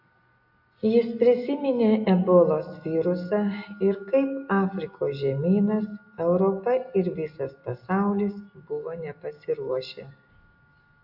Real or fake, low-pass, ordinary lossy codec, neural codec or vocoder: real; 5.4 kHz; AAC, 48 kbps; none